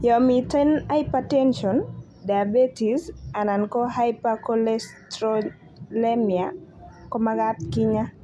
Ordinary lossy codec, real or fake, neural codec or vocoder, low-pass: none; real; none; none